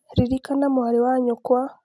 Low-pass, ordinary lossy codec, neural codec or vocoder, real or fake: none; none; none; real